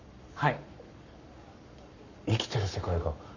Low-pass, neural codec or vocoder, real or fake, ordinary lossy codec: 7.2 kHz; codec, 44.1 kHz, 7.8 kbps, Pupu-Codec; fake; none